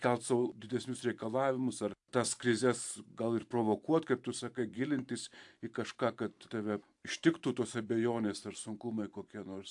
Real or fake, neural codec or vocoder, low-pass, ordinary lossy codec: fake; vocoder, 44.1 kHz, 128 mel bands every 512 samples, BigVGAN v2; 10.8 kHz; AAC, 64 kbps